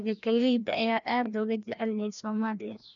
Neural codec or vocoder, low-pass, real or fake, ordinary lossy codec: codec, 16 kHz, 1 kbps, FreqCodec, larger model; 7.2 kHz; fake; none